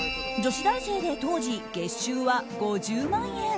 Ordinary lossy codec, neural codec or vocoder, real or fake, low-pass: none; none; real; none